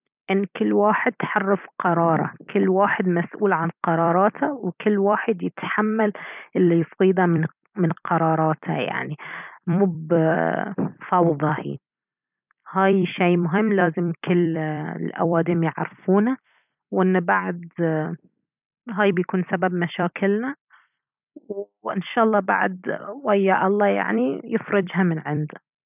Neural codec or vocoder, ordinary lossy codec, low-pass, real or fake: vocoder, 44.1 kHz, 128 mel bands every 256 samples, BigVGAN v2; none; 3.6 kHz; fake